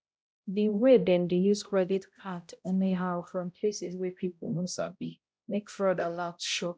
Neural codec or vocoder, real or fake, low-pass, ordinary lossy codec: codec, 16 kHz, 0.5 kbps, X-Codec, HuBERT features, trained on balanced general audio; fake; none; none